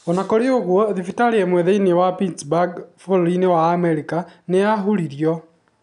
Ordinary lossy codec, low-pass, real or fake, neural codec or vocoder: none; 10.8 kHz; real; none